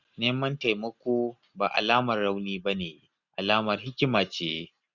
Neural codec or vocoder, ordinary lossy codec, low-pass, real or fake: none; none; 7.2 kHz; real